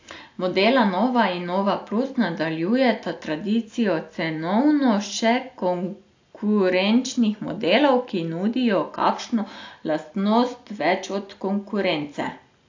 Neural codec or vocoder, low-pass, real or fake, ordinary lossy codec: none; 7.2 kHz; real; AAC, 48 kbps